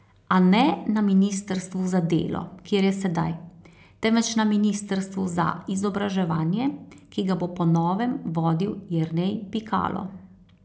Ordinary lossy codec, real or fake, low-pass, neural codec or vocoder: none; real; none; none